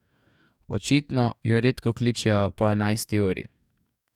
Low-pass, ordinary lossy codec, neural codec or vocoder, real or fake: 19.8 kHz; none; codec, 44.1 kHz, 2.6 kbps, DAC; fake